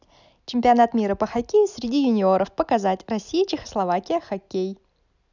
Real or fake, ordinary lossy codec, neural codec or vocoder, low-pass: real; none; none; 7.2 kHz